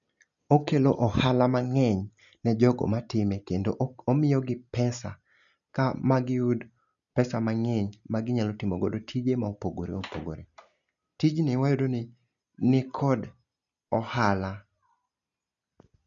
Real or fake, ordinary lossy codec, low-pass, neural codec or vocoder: real; none; 7.2 kHz; none